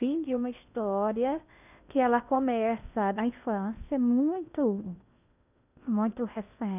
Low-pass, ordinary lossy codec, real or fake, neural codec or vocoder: 3.6 kHz; none; fake; codec, 16 kHz in and 24 kHz out, 0.6 kbps, FocalCodec, streaming, 2048 codes